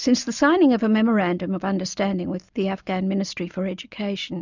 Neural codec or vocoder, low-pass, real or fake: none; 7.2 kHz; real